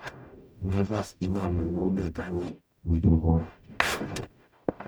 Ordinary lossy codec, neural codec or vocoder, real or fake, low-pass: none; codec, 44.1 kHz, 0.9 kbps, DAC; fake; none